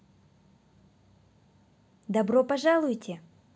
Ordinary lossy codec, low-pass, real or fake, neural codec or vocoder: none; none; real; none